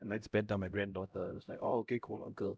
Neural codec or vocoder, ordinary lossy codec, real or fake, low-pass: codec, 16 kHz, 0.5 kbps, X-Codec, HuBERT features, trained on LibriSpeech; none; fake; none